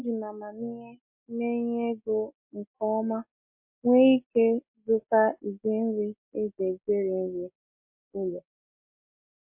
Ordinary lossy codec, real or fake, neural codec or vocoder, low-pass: MP3, 32 kbps; real; none; 3.6 kHz